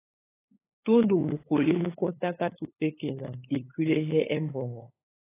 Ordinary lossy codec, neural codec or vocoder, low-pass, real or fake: AAC, 16 kbps; codec, 16 kHz, 8 kbps, FunCodec, trained on LibriTTS, 25 frames a second; 3.6 kHz; fake